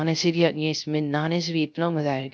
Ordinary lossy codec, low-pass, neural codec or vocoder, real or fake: none; none; codec, 16 kHz, 0.3 kbps, FocalCodec; fake